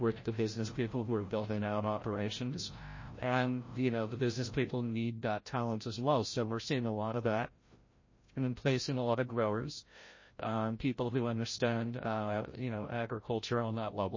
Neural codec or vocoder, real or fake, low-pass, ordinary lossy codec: codec, 16 kHz, 0.5 kbps, FreqCodec, larger model; fake; 7.2 kHz; MP3, 32 kbps